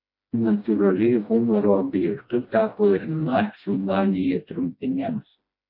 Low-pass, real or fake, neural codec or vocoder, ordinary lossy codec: 5.4 kHz; fake; codec, 16 kHz, 1 kbps, FreqCodec, smaller model; MP3, 32 kbps